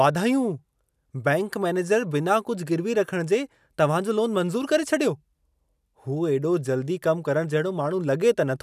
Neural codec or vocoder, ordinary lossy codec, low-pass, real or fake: none; none; 14.4 kHz; real